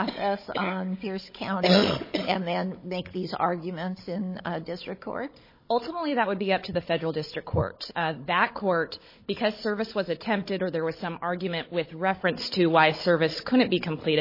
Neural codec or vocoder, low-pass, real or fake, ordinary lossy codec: codec, 16 kHz, 16 kbps, FunCodec, trained on Chinese and English, 50 frames a second; 5.4 kHz; fake; MP3, 24 kbps